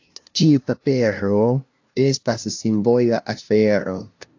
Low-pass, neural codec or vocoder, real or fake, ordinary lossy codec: 7.2 kHz; codec, 16 kHz, 0.5 kbps, FunCodec, trained on LibriTTS, 25 frames a second; fake; AAC, 48 kbps